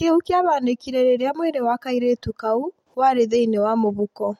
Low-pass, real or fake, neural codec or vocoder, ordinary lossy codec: 19.8 kHz; real; none; MP3, 64 kbps